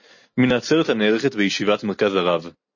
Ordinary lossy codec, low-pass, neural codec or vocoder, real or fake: MP3, 32 kbps; 7.2 kHz; none; real